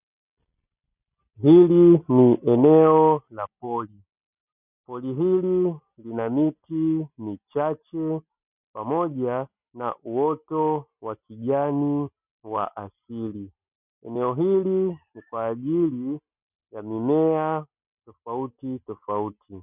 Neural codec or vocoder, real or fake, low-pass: none; real; 3.6 kHz